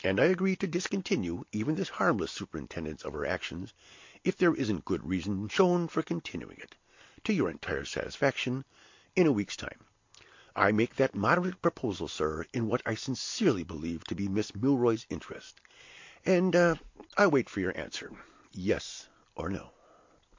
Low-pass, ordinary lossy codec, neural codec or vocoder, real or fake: 7.2 kHz; MP3, 48 kbps; none; real